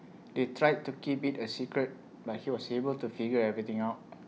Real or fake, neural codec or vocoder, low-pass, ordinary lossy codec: real; none; none; none